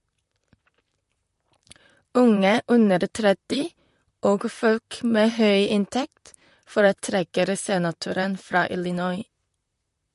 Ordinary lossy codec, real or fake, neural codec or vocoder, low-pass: MP3, 48 kbps; fake; vocoder, 44.1 kHz, 128 mel bands, Pupu-Vocoder; 14.4 kHz